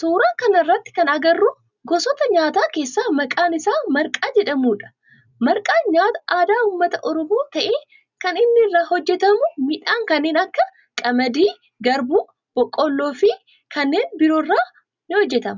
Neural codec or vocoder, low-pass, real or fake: none; 7.2 kHz; real